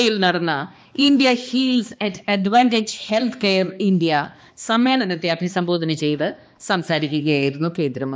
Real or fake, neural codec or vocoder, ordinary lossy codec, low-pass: fake; codec, 16 kHz, 2 kbps, X-Codec, HuBERT features, trained on balanced general audio; none; none